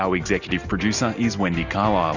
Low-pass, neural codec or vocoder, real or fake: 7.2 kHz; none; real